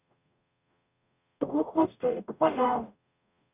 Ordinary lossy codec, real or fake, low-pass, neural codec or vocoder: none; fake; 3.6 kHz; codec, 44.1 kHz, 0.9 kbps, DAC